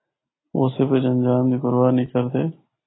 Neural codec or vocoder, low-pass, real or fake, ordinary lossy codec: none; 7.2 kHz; real; AAC, 16 kbps